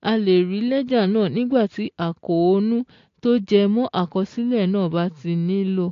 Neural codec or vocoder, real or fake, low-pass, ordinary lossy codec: none; real; 7.2 kHz; AAC, 48 kbps